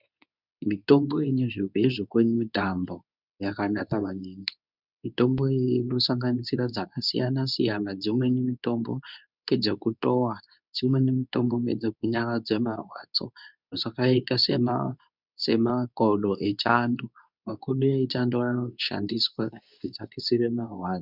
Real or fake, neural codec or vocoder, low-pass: fake; codec, 24 kHz, 0.9 kbps, WavTokenizer, medium speech release version 2; 5.4 kHz